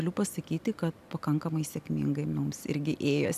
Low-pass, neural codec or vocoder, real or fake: 14.4 kHz; none; real